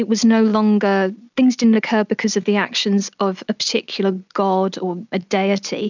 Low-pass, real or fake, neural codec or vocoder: 7.2 kHz; real; none